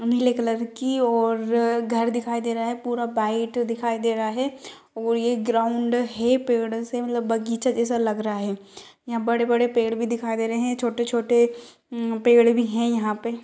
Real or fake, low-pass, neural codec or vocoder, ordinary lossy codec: real; none; none; none